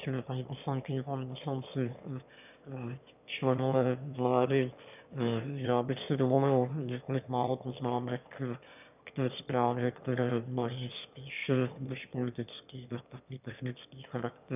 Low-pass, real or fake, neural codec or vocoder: 3.6 kHz; fake; autoencoder, 22.05 kHz, a latent of 192 numbers a frame, VITS, trained on one speaker